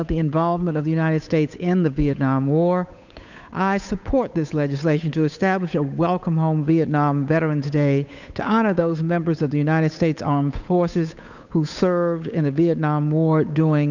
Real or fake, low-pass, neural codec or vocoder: fake; 7.2 kHz; codec, 16 kHz, 8 kbps, FunCodec, trained on Chinese and English, 25 frames a second